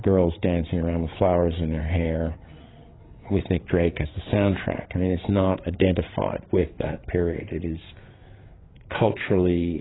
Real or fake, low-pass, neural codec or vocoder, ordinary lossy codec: fake; 7.2 kHz; codec, 16 kHz, 8 kbps, FreqCodec, larger model; AAC, 16 kbps